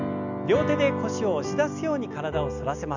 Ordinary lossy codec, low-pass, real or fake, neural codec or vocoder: none; 7.2 kHz; real; none